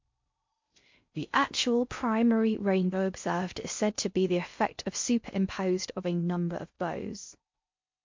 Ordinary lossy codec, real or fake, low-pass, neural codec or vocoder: MP3, 48 kbps; fake; 7.2 kHz; codec, 16 kHz in and 24 kHz out, 0.6 kbps, FocalCodec, streaming, 4096 codes